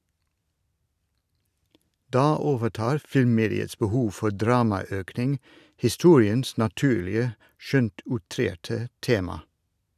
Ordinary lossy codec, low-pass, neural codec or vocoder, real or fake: none; 14.4 kHz; none; real